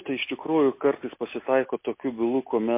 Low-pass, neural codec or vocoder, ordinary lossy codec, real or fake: 3.6 kHz; none; MP3, 24 kbps; real